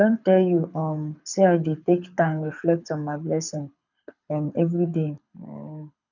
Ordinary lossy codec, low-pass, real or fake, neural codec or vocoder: none; 7.2 kHz; fake; codec, 24 kHz, 6 kbps, HILCodec